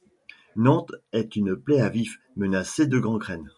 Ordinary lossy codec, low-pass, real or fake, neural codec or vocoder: MP3, 64 kbps; 10.8 kHz; real; none